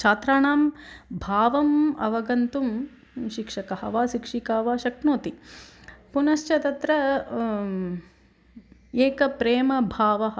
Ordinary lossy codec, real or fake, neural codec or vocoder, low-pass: none; real; none; none